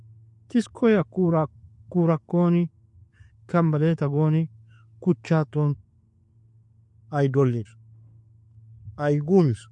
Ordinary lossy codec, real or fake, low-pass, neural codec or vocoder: MP3, 48 kbps; real; 10.8 kHz; none